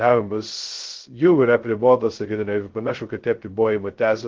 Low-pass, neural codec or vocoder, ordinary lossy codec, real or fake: 7.2 kHz; codec, 16 kHz, 0.2 kbps, FocalCodec; Opus, 16 kbps; fake